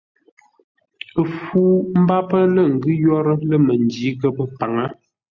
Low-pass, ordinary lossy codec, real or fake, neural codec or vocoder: 7.2 kHz; Opus, 64 kbps; real; none